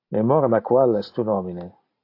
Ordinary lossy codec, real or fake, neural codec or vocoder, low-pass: AAC, 32 kbps; real; none; 5.4 kHz